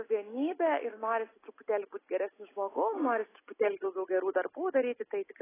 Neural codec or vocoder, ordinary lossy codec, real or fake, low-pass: none; AAC, 16 kbps; real; 3.6 kHz